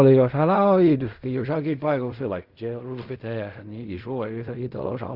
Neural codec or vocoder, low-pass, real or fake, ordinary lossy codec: codec, 16 kHz in and 24 kHz out, 0.4 kbps, LongCat-Audio-Codec, fine tuned four codebook decoder; 5.4 kHz; fake; Opus, 64 kbps